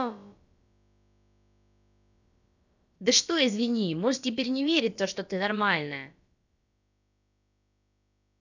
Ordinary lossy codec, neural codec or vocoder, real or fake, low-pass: none; codec, 16 kHz, about 1 kbps, DyCAST, with the encoder's durations; fake; 7.2 kHz